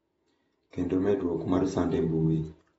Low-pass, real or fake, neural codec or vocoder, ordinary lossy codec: 19.8 kHz; fake; vocoder, 48 kHz, 128 mel bands, Vocos; AAC, 24 kbps